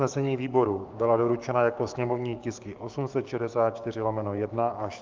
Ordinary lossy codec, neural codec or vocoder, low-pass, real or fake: Opus, 24 kbps; codec, 16 kHz, 6 kbps, DAC; 7.2 kHz; fake